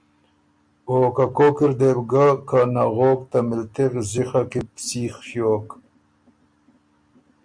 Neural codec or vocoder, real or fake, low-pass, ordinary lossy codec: none; real; 9.9 kHz; MP3, 64 kbps